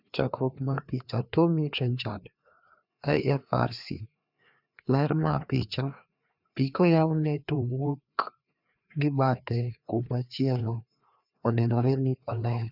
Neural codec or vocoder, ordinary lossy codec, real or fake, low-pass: codec, 16 kHz, 2 kbps, FreqCodec, larger model; none; fake; 5.4 kHz